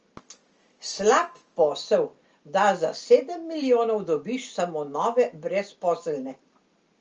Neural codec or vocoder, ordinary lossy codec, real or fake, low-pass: none; Opus, 24 kbps; real; 7.2 kHz